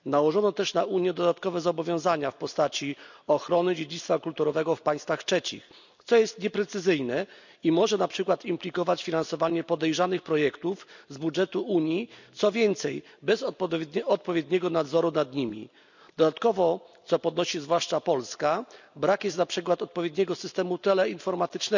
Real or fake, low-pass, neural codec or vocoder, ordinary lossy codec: real; 7.2 kHz; none; none